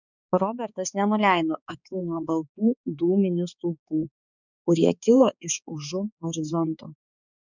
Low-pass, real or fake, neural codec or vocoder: 7.2 kHz; fake; codec, 16 kHz, 4 kbps, X-Codec, HuBERT features, trained on balanced general audio